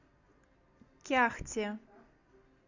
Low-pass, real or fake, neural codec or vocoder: 7.2 kHz; real; none